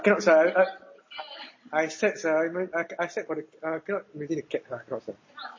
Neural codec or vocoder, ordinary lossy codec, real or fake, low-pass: none; MP3, 32 kbps; real; 7.2 kHz